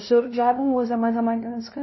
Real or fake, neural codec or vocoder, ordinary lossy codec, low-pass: fake; codec, 16 kHz, 0.5 kbps, FunCodec, trained on LibriTTS, 25 frames a second; MP3, 24 kbps; 7.2 kHz